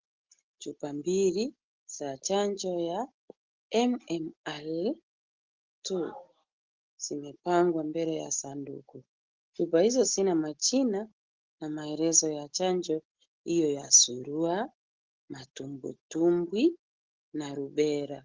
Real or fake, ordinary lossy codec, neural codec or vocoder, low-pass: real; Opus, 16 kbps; none; 7.2 kHz